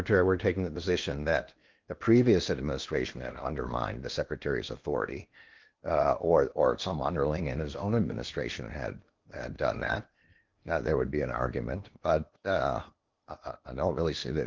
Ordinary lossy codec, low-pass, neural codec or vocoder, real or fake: Opus, 32 kbps; 7.2 kHz; codec, 16 kHz in and 24 kHz out, 0.8 kbps, FocalCodec, streaming, 65536 codes; fake